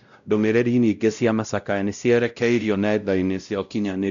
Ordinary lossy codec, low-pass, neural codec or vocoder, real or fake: MP3, 96 kbps; 7.2 kHz; codec, 16 kHz, 0.5 kbps, X-Codec, WavLM features, trained on Multilingual LibriSpeech; fake